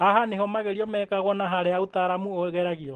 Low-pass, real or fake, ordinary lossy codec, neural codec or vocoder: 9.9 kHz; real; Opus, 16 kbps; none